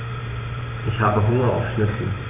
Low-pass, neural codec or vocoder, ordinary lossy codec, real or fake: 3.6 kHz; vocoder, 44.1 kHz, 128 mel bands every 512 samples, BigVGAN v2; none; fake